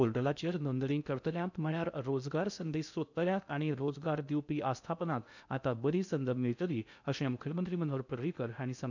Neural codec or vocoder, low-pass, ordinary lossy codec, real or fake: codec, 16 kHz in and 24 kHz out, 0.6 kbps, FocalCodec, streaming, 2048 codes; 7.2 kHz; none; fake